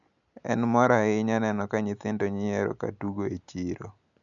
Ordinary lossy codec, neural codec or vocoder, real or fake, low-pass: none; none; real; 7.2 kHz